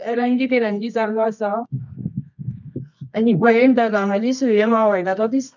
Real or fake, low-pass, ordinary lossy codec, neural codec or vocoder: fake; 7.2 kHz; none; codec, 24 kHz, 0.9 kbps, WavTokenizer, medium music audio release